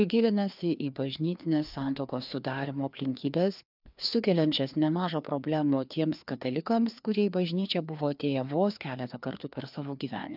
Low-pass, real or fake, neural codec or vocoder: 5.4 kHz; fake; codec, 16 kHz, 2 kbps, FreqCodec, larger model